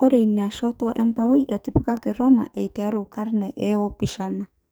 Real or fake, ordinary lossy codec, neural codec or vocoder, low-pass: fake; none; codec, 44.1 kHz, 2.6 kbps, SNAC; none